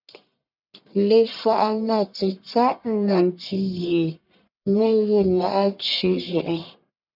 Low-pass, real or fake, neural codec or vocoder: 5.4 kHz; fake; codec, 44.1 kHz, 1.7 kbps, Pupu-Codec